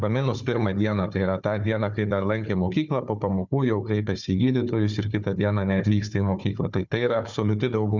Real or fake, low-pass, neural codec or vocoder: fake; 7.2 kHz; codec, 16 kHz, 4 kbps, FunCodec, trained on Chinese and English, 50 frames a second